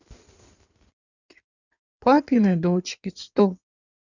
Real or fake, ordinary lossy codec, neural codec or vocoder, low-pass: fake; none; codec, 16 kHz in and 24 kHz out, 2.2 kbps, FireRedTTS-2 codec; 7.2 kHz